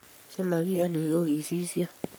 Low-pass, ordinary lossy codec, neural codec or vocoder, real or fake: none; none; codec, 44.1 kHz, 3.4 kbps, Pupu-Codec; fake